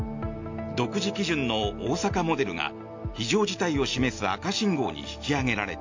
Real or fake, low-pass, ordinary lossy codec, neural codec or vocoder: real; 7.2 kHz; MP3, 48 kbps; none